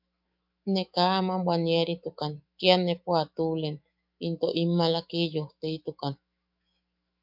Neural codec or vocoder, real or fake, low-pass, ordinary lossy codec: autoencoder, 48 kHz, 128 numbers a frame, DAC-VAE, trained on Japanese speech; fake; 5.4 kHz; MP3, 48 kbps